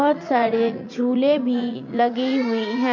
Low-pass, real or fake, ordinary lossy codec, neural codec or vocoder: 7.2 kHz; fake; MP3, 48 kbps; vocoder, 44.1 kHz, 80 mel bands, Vocos